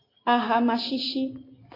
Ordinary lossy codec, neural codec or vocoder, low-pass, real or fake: AAC, 24 kbps; none; 5.4 kHz; real